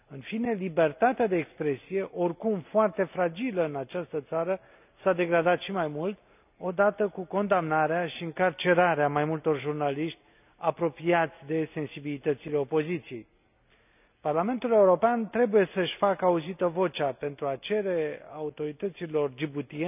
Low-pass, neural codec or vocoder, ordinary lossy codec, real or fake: 3.6 kHz; none; none; real